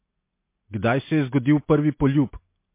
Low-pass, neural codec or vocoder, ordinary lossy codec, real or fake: 3.6 kHz; none; MP3, 24 kbps; real